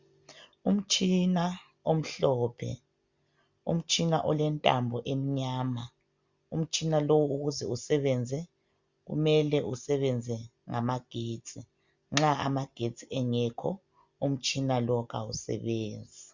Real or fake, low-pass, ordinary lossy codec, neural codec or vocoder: real; 7.2 kHz; Opus, 64 kbps; none